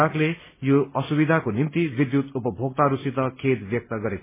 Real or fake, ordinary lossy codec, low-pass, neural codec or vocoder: real; MP3, 16 kbps; 3.6 kHz; none